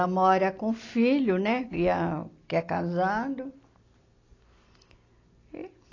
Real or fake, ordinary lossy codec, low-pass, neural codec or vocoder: real; AAC, 32 kbps; 7.2 kHz; none